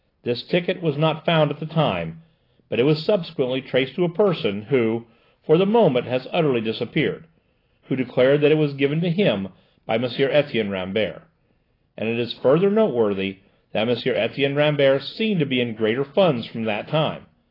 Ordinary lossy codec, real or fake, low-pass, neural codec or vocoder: AAC, 24 kbps; real; 5.4 kHz; none